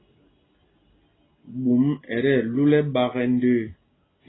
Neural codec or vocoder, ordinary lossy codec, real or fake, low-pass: none; AAC, 16 kbps; real; 7.2 kHz